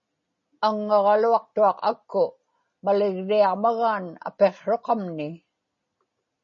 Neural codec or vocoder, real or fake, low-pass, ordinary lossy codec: none; real; 7.2 kHz; MP3, 32 kbps